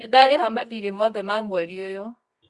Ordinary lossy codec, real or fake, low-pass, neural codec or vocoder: Opus, 64 kbps; fake; 10.8 kHz; codec, 24 kHz, 0.9 kbps, WavTokenizer, medium music audio release